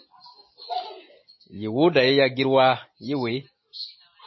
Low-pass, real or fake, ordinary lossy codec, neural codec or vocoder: 7.2 kHz; real; MP3, 24 kbps; none